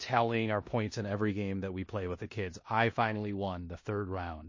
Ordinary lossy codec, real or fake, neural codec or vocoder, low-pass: MP3, 32 kbps; fake; codec, 16 kHz in and 24 kHz out, 0.9 kbps, LongCat-Audio-Codec, four codebook decoder; 7.2 kHz